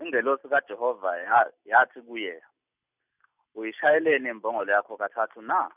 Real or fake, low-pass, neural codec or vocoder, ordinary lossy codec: real; 3.6 kHz; none; none